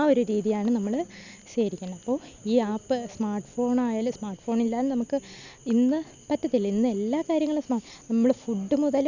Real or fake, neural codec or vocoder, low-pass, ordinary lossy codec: real; none; 7.2 kHz; none